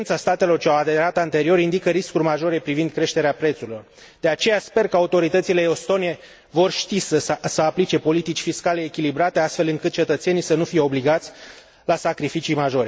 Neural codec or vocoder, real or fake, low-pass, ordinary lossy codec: none; real; none; none